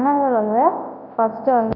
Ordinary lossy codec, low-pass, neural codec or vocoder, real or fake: none; 5.4 kHz; codec, 24 kHz, 0.9 kbps, WavTokenizer, large speech release; fake